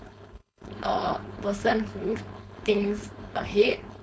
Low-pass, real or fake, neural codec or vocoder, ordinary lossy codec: none; fake; codec, 16 kHz, 4.8 kbps, FACodec; none